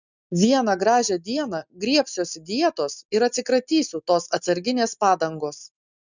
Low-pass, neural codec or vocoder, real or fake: 7.2 kHz; none; real